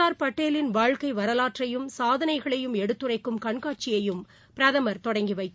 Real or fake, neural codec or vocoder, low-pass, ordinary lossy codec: real; none; none; none